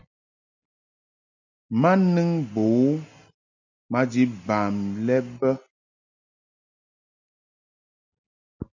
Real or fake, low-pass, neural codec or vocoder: real; 7.2 kHz; none